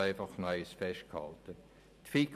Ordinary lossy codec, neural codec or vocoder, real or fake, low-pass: MP3, 96 kbps; vocoder, 44.1 kHz, 128 mel bands every 512 samples, BigVGAN v2; fake; 14.4 kHz